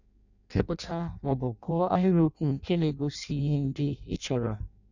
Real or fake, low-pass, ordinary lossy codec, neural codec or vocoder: fake; 7.2 kHz; none; codec, 16 kHz in and 24 kHz out, 0.6 kbps, FireRedTTS-2 codec